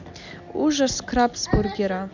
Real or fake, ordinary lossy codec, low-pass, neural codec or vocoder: real; none; 7.2 kHz; none